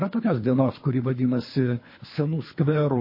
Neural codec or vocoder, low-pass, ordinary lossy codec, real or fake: codec, 24 kHz, 3 kbps, HILCodec; 5.4 kHz; MP3, 24 kbps; fake